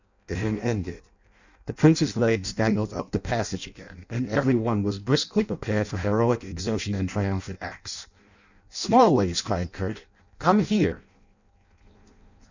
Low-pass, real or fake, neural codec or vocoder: 7.2 kHz; fake; codec, 16 kHz in and 24 kHz out, 0.6 kbps, FireRedTTS-2 codec